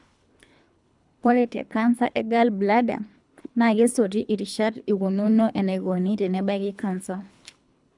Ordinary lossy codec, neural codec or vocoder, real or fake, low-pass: none; codec, 24 kHz, 3 kbps, HILCodec; fake; 10.8 kHz